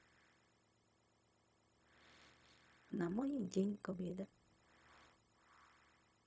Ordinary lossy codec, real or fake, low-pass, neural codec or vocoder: none; fake; none; codec, 16 kHz, 0.4 kbps, LongCat-Audio-Codec